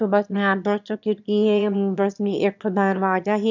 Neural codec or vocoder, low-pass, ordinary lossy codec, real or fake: autoencoder, 22.05 kHz, a latent of 192 numbers a frame, VITS, trained on one speaker; 7.2 kHz; none; fake